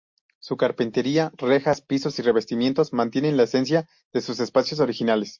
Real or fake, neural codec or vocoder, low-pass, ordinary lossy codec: real; none; 7.2 kHz; MP3, 48 kbps